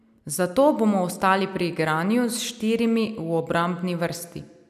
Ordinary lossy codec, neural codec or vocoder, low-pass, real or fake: none; none; 14.4 kHz; real